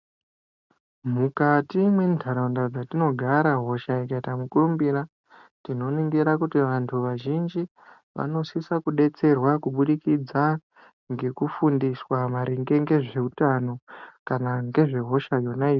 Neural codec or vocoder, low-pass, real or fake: none; 7.2 kHz; real